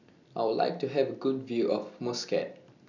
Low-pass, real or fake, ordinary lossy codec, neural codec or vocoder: 7.2 kHz; real; none; none